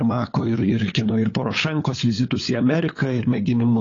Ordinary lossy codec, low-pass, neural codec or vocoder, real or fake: AAC, 32 kbps; 7.2 kHz; codec, 16 kHz, 8 kbps, FunCodec, trained on LibriTTS, 25 frames a second; fake